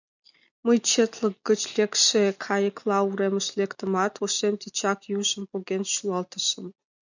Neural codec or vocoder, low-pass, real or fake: none; 7.2 kHz; real